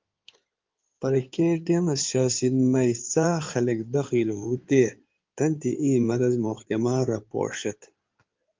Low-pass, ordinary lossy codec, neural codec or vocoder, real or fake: 7.2 kHz; Opus, 32 kbps; codec, 16 kHz in and 24 kHz out, 2.2 kbps, FireRedTTS-2 codec; fake